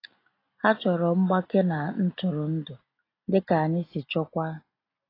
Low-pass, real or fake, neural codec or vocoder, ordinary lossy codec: 5.4 kHz; real; none; AAC, 24 kbps